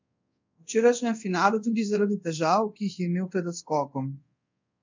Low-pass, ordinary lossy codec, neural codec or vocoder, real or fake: 7.2 kHz; MP3, 64 kbps; codec, 24 kHz, 0.5 kbps, DualCodec; fake